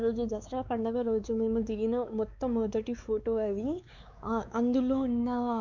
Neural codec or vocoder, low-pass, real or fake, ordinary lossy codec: codec, 16 kHz, 4 kbps, X-Codec, WavLM features, trained on Multilingual LibriSpeech; 7.2 kHz; fake; none